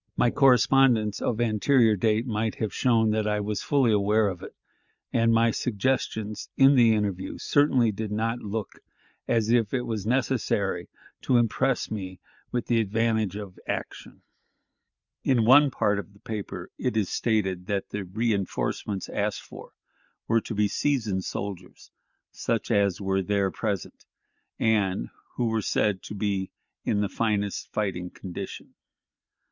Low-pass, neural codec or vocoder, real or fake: 7.2 kHz; none; real